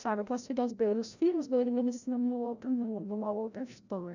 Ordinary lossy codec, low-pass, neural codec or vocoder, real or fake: none; 7.2 kHz; codec, 16 kHz, 0.5 kbps, FreqCodec, larger model; fake